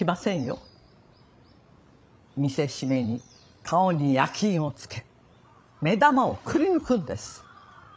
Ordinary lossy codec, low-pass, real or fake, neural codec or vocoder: none; none; fake; codec, 16 kHz, 16 kbps, FreqCodec, larger model